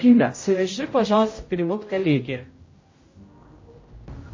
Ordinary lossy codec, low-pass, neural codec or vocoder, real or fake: MP3, 32 kbps; 7.2 kHz; codec, 16 kHz, 0.5 kbps, X-Codec, HuBERT features, trained on general audio; fake